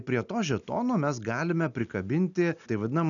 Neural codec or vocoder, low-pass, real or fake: none; 7.2 kHz; real